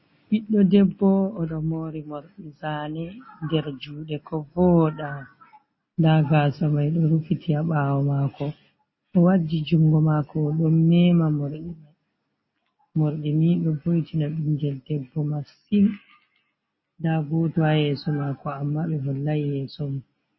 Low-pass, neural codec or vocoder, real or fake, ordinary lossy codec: 7.2 kHz; none; real; MP3, 24 kbps